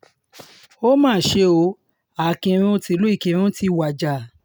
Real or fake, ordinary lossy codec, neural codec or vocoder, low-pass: real; none; none; none